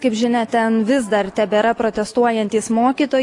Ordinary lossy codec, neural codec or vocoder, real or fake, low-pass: AAC, 48 kbps; none; real; 10.8 kHz